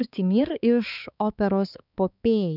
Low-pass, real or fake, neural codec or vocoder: 5.4 kHz; fake; codec, 16 kHz, 4 kbps, X-Codec, HuBERT features, trained on balanced general audio